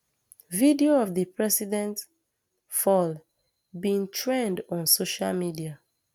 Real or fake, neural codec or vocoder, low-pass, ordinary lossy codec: real; none; 19.8 kHz; none